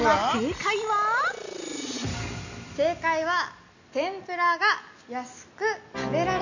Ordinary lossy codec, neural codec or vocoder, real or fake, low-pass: none; none; real; 7.2 kHz